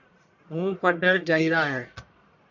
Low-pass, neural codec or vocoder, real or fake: 7.2 kHz; codec, 44.1 kHz, 1.7 kbps, Pupu-Codec; fake